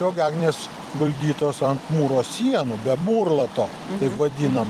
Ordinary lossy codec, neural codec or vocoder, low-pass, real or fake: Opus, 32 kbps; vocoder, 44.1 kHz, 128 mel bands every 256 samples, BigVGAN v2; 14.4 kHz; fake